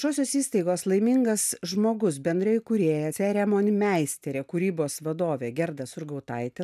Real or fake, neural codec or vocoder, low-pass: real; none; 14.4 kHz